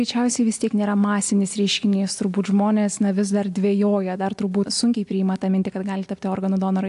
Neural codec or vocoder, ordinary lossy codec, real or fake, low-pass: none; AAC, 64 kbps; real; 10.8 kHz